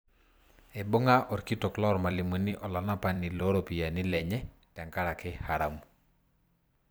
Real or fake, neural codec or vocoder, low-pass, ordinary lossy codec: fake; vocoder, 44.1 kHz, 128 mel bands every 256 samples, BigVGAN v2; none; none